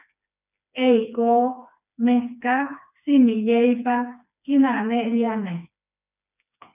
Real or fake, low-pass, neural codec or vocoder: fake; 3.6 kHz; codec, 16 kHz, 2 kbps, FreqCodec, smaller model